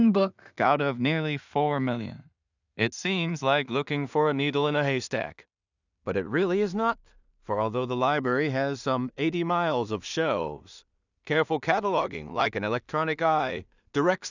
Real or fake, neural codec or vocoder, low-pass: fake; codec, 16 kHz in and 24 kHz out, 0.4 kbps, LongCat-Audio-Codec, two codebook decoder; 7.2 kHz